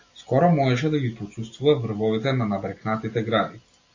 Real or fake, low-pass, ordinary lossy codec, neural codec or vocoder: real; 7.2 kHz; AAC, 48 kbps; none